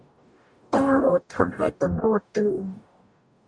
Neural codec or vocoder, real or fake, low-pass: codec, 44.1 kHz, 0.9 kbps, DAC; fake; 9.9 kHz